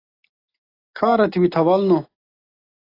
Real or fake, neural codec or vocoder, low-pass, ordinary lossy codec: real; none; 5.4 kHz; AAC, 24 kbps